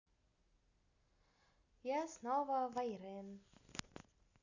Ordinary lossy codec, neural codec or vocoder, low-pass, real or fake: none; none; 7.2 kHz; real